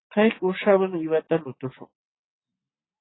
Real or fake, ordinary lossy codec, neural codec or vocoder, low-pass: real; AAC, 16 kbps; none; 7.2 kHz